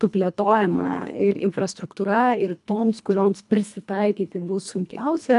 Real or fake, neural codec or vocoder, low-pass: fake; codec, 24 kHz, 1.5 kbps, HILCodec; 10.8 kHz